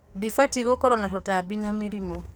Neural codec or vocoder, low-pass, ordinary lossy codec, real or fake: codec, 44.1 kHz, 2.6 kbps, SNAC; none; none; fake